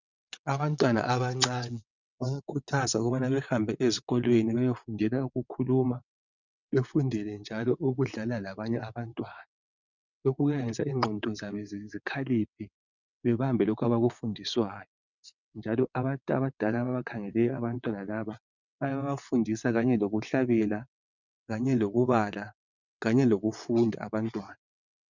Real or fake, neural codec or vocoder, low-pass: fake; vocoder, 22.05 kHz, 80 mel bands, WaveNeXt; 7.2 kHz